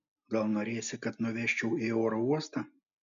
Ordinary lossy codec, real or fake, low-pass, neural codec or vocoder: AAC, 96 kbps; real; 7.2 kHz; none